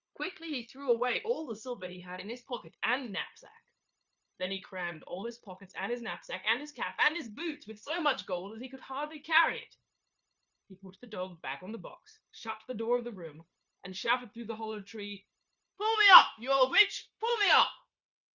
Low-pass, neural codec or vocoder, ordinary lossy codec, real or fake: 7.2 kHz; codec, 16 kHz, 0.9 kbps, LongCat-Audio-Codec; Opus, 64 kbps; fake